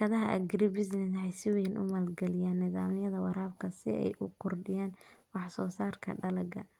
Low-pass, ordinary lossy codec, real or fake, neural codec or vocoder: 19.8 kHz; Opus, 32 kbps; real; none